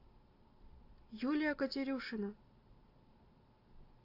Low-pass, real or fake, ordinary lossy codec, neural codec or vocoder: 5.4 kHz; real; AAC, 32 kbps; none